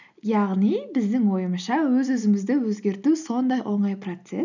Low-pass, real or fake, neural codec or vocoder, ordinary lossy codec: 7.2 kHz; real; none; none